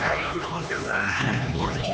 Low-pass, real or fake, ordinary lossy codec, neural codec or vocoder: none; fake; none; codec, 16 kHz, 2 kbps, X-Codec, HuBERT features, trained on LibriSpeech